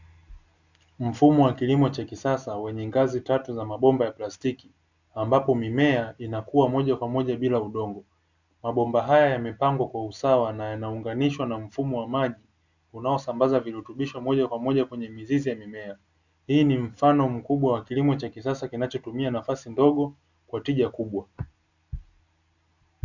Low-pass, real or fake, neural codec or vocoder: 7.2 kHz; real; none